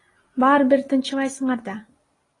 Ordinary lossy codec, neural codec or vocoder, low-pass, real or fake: AAC, 32 kbps; none; 10.8 kHz; real